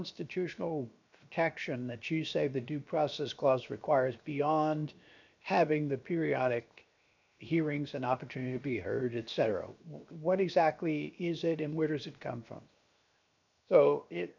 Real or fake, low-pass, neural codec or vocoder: fake; 7.2 kHz; codec, 16 kHz, 0.7 kbps, FocalCodec